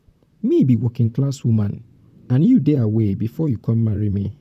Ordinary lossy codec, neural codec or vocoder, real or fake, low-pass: none; vocoder, 44.1 kHz, 128 mel bands, Pupu-Vocoder; fake; 14.4 kHz